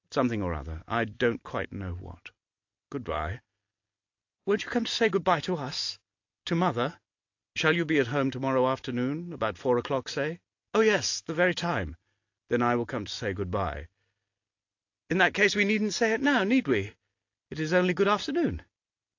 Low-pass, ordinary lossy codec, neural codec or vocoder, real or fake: 7.2 kHz; AAC, 48 kbps; none; real